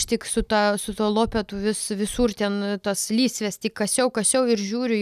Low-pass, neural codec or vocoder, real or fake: 14.4 kHz; none; real